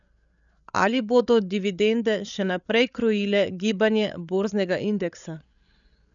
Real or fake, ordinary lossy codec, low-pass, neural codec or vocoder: fake; none; 7.2 kHz; codec, 16 kHz, 8 kbps, FreqCodec, larger model